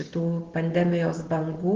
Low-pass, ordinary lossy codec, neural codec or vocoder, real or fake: 7.2 kHz; Opus, 16 kbps; none; real